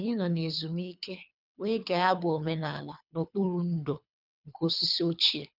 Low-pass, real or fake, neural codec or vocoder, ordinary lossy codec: 5.4 kHz; fake; codec, 24 kHz, 3 kbps, HILCodec; none